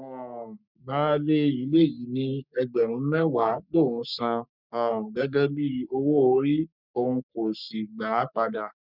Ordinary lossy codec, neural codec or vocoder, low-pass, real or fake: none; codec, 44.1 kHz, 3.4 kbps, Pupu-Codec; 5.4 kHz; fake